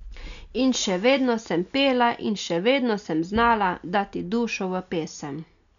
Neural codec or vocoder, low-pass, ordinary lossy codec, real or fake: none; 7.2 kHz; none; real